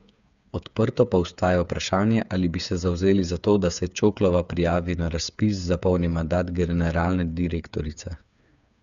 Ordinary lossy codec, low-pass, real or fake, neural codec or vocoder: none; 7.2 kHz; fake; codec, 16 kHz, 8 kbps, FreqCodec, smaller model